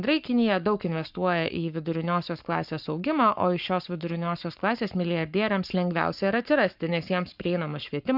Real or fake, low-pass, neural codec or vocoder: fake; 5.4 kHz; codec, 44.1 kHz, 7.8 kbps, Pupu-Codec